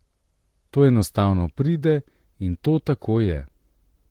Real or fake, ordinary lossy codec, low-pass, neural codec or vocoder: fake; Opus, 16 kbps; 19.8 kHz; vocoder, 44.1 kHz, 128 mel bands, Pupu-Vocoder